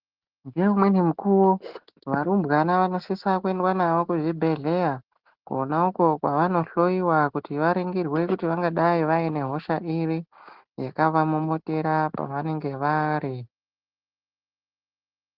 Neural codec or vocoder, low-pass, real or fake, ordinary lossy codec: none; 5.4 kHz; real; Opus, 16 kbps